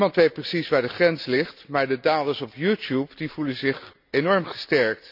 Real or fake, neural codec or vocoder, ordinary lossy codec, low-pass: real; none; none; 5.4 kHz